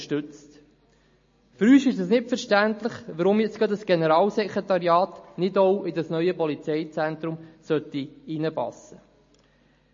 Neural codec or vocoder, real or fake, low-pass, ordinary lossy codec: none; real; 7.2 kHz; MP3, 32 kbps